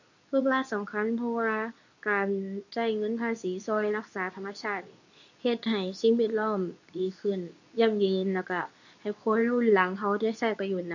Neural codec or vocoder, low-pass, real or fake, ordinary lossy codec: codec, 24 kHz, 0.9 kbps, WavTokenizer, medium speech release version 2; 7.2 kHz; fake; none